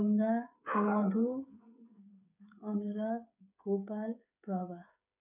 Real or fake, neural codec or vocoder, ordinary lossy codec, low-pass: fake; codec, 16 kHz, 8 kbps, FreqCodec, smaller model; none; 3.6 kHz